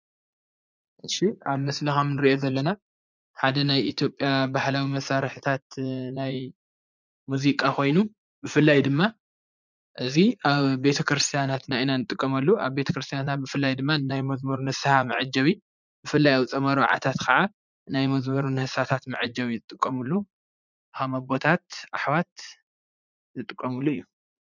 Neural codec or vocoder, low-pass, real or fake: vocoder, 44.1 kHz, 80 mel bands, Vocos; 7.2 kHz; fake